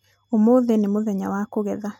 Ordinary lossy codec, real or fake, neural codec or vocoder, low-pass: MP3, 64 kbps; real; none; 19.8 kHz